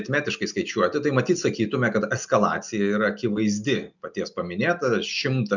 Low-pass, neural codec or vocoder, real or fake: 7.2 kHz; none; real